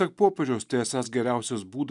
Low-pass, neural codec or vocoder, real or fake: 10.8 kHz; none; real